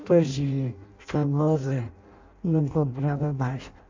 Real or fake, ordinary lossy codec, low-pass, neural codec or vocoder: fake; MP3, 64 kbps; 7.2 kHz; codec, 16 kHz in and 24 kHz out, 0.6 kbps, FireRedTTS-2 codec